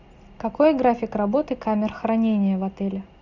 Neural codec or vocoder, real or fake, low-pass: none; real; 7.2 kHz